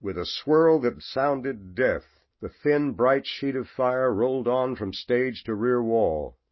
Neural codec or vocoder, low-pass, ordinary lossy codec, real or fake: none; 7.2 kHz; MP3, 24 kbps; real